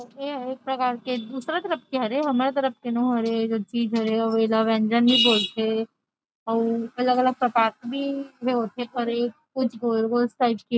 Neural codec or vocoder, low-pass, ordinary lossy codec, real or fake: none; none; none; real